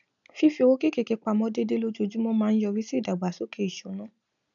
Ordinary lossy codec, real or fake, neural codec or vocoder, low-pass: none; real; none; 7.2 kHz